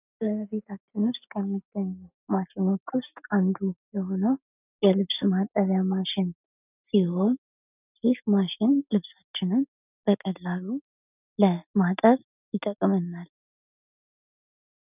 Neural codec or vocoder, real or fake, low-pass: none; real; 3.6 kHz